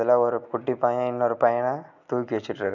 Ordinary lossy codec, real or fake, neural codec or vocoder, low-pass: none; real; none; 7.2 kHz